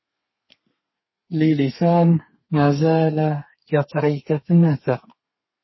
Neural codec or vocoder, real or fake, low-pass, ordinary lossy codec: codec, 32 kHz, 1.9 kbps, SNAC; fake; 7.2 kHz; MP3, 24 kbps